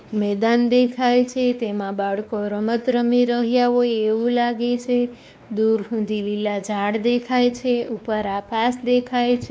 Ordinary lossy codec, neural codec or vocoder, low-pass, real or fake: none; codec, 16 kHz, 2 kbps, X-Codec, WavLM features, trained on Multilingual LibriSpeech; none; fake